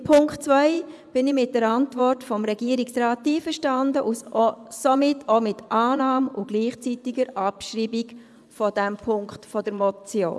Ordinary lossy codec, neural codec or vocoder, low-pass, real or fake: none; vocoder, 24 kHz, 100 mel bands, Vocos; none; fake